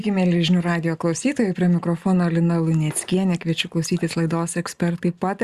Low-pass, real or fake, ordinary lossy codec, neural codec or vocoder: 14.4 kHz; real; Opus, 64 kbps; none